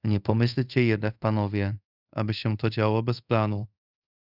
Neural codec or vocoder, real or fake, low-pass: codec, 24 kHz, 0.5 kbps, DualCodec; fake; 5.4 kHz